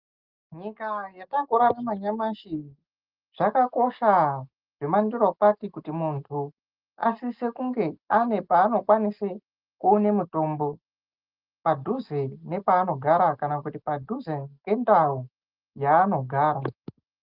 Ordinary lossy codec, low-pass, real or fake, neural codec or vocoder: Opus, 24 kbps; 5.4 kHz; real; none